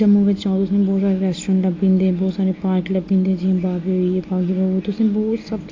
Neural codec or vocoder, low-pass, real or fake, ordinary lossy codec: none; 7.2 kHz; real; AAC, 32 kbps